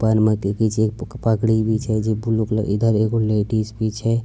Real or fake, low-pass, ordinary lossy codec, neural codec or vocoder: real; none; none; none